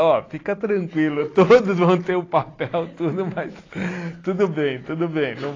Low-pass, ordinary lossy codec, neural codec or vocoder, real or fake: 7.2 kHz; AAC, 32 kbps; none; real